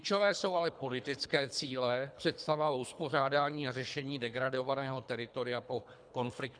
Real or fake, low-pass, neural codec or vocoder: fake; 9.9 kHz; codec, 24 kHz, 3 kbps, HILCodec